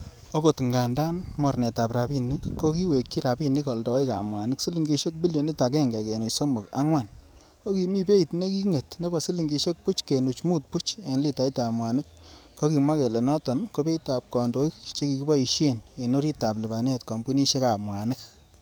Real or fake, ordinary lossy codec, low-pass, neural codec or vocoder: fake; none; none; codec, 44.1 kHz, 7.8 kbps, DAC